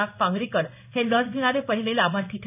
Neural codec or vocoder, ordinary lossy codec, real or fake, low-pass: codec, 16 kHz in and 24 kHz out, 1 kbps, XY-Tokenizer; none; fake; 3.6 kHz